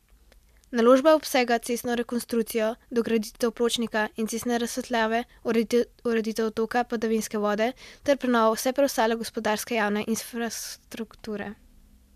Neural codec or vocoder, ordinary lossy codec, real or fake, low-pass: none; MP3, 96 kbps; real; 14.4 kHz